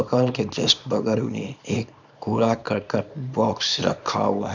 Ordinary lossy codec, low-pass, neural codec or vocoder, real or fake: none; 7.2 kHz; codec, 24 kHz, 0.9 kbps, WavTokenizer, small release; fake